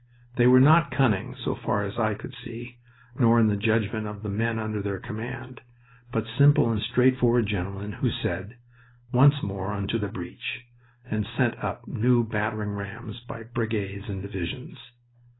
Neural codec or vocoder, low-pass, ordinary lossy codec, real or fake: none; 7.2 kHz; AAC, 16 kbps; real